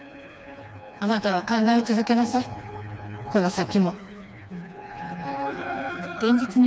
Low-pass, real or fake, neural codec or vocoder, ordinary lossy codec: none; fake; codec, 16 kHz, 2 kbps, FreqCodec, smaller model; none